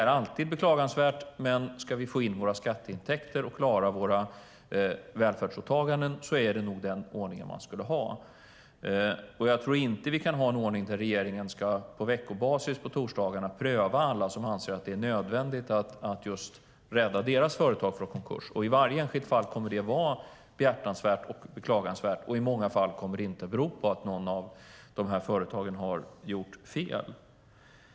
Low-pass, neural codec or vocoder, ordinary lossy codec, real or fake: none; none; none; real